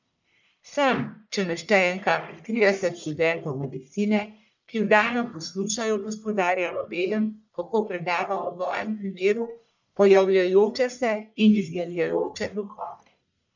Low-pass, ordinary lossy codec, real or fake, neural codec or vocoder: 7.2 kHz; none; fake; codec, 44.1 kHz, 1.7 kbps, Pupu-Codec